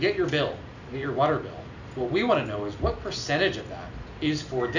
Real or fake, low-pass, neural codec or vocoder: real; 7.2 kHz; none